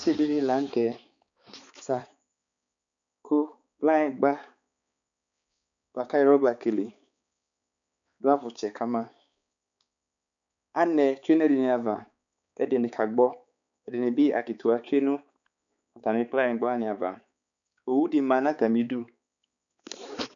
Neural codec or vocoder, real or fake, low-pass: codec, 16 kHz, 4 kbps, X-Codec, HuBERT features, trained on balanced general audio; fake; 7.2 kHz